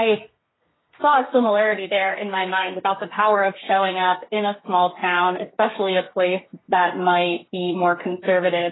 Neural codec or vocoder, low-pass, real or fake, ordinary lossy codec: codec, 32 kHz, 1.9 kbps, SNAC; 7.2 kHz; fake; AAC, 16 kbps